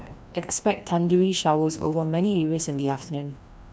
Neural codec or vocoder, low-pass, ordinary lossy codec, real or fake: codec, 16 kHz, 1 kbps, FreqCodec, larger model; none; none; fake